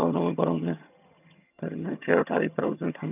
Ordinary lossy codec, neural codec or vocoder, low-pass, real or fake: none; vocoder, 22.05 kHz, 80 mel bands, HiFi-GAN; 3.6 kHz; fake